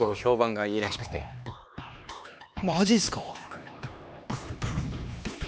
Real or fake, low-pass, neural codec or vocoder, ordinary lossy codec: fake; none; codec, 16 kHz, 2 kbps, X-Codec, HuBERT features, trained on LibriSpeech; none